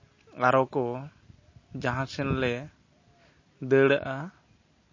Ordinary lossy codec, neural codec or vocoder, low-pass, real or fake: MP3, 32 kbps; none; 7.2 kHz; real